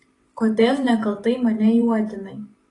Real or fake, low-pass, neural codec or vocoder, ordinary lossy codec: fake; 10.8 kHz; vocoder, 44.1 kHz, 128 mel bands every 512 samples, BigVGAN v2; AAC, 32 kbps